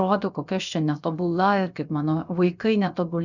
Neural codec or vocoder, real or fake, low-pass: codec, 16 kHz, about 1 kbps, DyCAST, with the encoder's durations; fake; 7.2 kHz